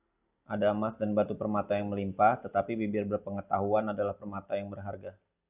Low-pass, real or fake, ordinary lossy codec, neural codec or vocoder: 3.6 kHz; real; Opus, 64 kbps; none